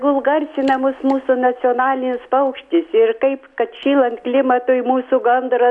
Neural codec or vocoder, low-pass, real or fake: none; 10.8 kHz; real